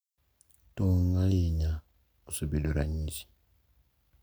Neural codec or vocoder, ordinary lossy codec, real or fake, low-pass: none; none; real; none